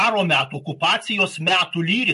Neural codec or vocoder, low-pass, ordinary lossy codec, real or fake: none; 14.4 kHz; MP3, 48 kbps; real